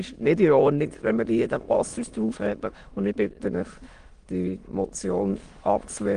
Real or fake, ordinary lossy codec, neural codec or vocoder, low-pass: fake; Opus, 24 kbps; autoencoder, 22.05 kHz, a latent of 192 numbers a frame, VITS, trained on many speakers; 9.9 kHz